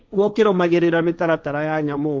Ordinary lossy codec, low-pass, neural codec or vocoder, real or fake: none; none; codec, 16 kHz, 1.1 kbps, Voila-Tokenizer; fake